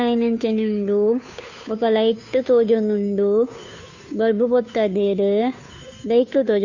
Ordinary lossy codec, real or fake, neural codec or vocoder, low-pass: none; fake; codec, 16 kHz, 2 kbps, FunCodec, trained on Chinese and English, 25 frames a second; 7.2 kHz